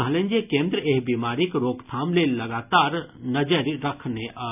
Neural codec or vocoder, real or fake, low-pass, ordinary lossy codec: none; real; 3.6 kHz; none